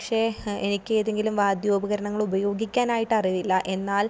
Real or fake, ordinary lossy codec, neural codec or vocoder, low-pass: real; none; none; none